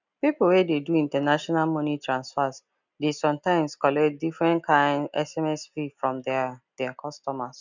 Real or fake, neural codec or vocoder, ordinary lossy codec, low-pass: real; none; none; 7.2 kHz